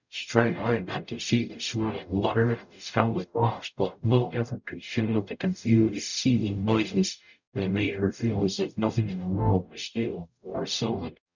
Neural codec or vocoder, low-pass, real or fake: codec, 44.1 kHz, 0.9 kbps, DAC; 7.2 kHz; fake